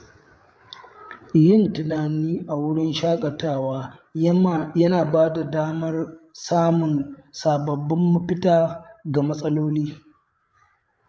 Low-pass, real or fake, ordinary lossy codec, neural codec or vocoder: none; fake; none; codec, 16 kHz, 8 kbps, FreqCodec, larger model